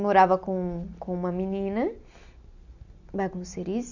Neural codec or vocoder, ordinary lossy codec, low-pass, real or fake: none; none; 7.2 kHz; real